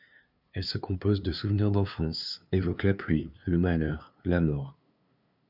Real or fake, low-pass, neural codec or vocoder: fake; 5.4 kHz; codec, 16 kHz, 2 kbps, FunCodec, trained on LibriTTS, 25 frames a second